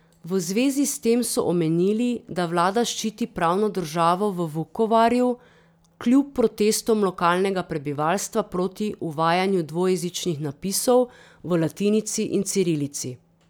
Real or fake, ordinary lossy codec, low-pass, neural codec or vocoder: real; none; none; none